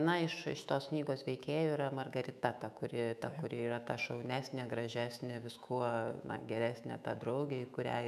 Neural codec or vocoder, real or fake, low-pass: autoencoder, 48 kHz, 128 numbers a frame, DAC-VAE, trained on Japanese speech; fake; 14.4 kHz